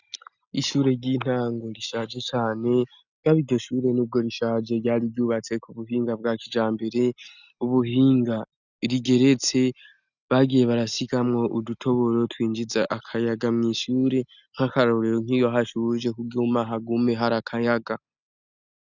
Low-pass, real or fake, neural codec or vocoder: 7.2 kHz; real; none